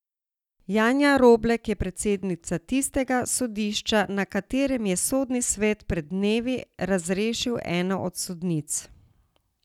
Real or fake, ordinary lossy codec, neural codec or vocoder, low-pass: real; none; none; 19.8 kHz